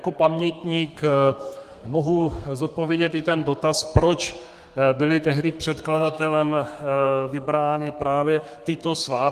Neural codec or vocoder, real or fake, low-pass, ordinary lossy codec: codec, 32 kHz, 1.9 kbps, SNAC; fake; 14.4 kHz; Opus, 32 kbps